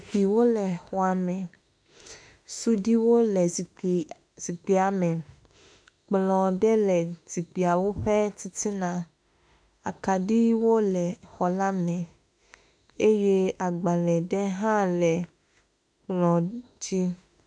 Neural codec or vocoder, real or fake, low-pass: autoencoder, 48 kHz, 32 numbers a frame, DAC-VAE, trained on Japanese speech; fake; 9.9 kHz